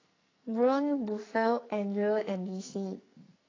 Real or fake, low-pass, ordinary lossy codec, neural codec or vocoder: fake; 7.2 kHz; AAC, 32 kbps; codec, 44.1 kHz, 2.6 kbps, SNAC